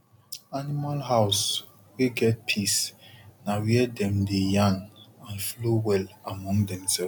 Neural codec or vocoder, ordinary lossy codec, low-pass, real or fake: none; none; none; real